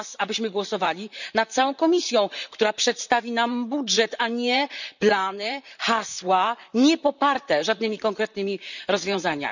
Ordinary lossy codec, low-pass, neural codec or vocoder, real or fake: none; 7.2 kHz; vocoder, 44.1 kHz, 128 mel bands, Pupu-Vocoder; fake